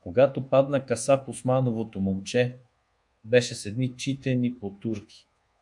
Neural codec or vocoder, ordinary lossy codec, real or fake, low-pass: codec, 24 kHz, 1.2 kbps, DualCodec; MP3, 64 kbps; fake; 10.8 kHz